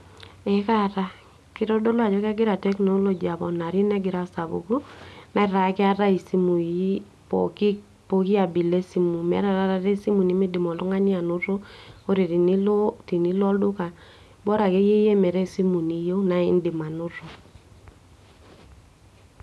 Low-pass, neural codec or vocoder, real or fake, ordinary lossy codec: none; none; real; none